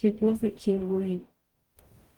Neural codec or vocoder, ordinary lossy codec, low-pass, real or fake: codec, 44.1 kHz, 0.9 kbps, DAC; Opus, 24 kbps; 19.8 kHz; fake